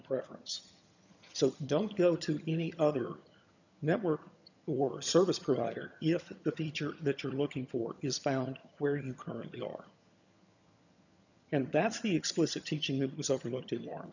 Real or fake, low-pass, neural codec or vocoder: fake; 7.2 kHz; vocoder, 22.05 kHz, 80 mel bands, HiFi-GAN